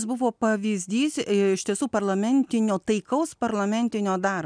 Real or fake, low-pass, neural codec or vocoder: real; 9.9 kHz; none